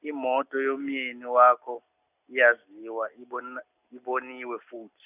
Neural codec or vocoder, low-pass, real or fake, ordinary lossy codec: none; 3.6 kHz; real; none